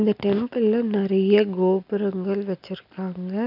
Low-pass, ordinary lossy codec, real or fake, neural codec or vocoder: 5.4 kHz; none; real; none